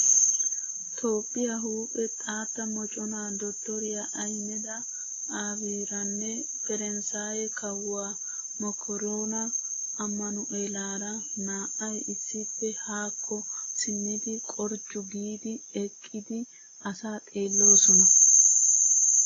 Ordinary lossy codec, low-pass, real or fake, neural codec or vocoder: AAC, 32 kbps; 7.2 kHz; real; none